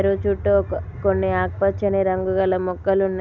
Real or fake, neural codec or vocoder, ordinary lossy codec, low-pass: real; none; none; 7.2 kHz